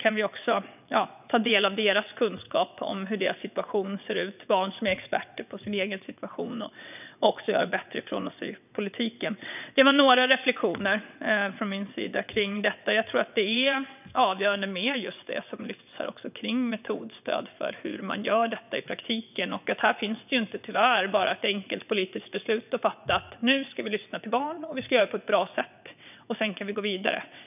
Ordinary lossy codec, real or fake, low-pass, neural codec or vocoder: none; fake; 3.6 kHz; vocoder, 22.05 kHz, 80 mel bands, WaveNeXt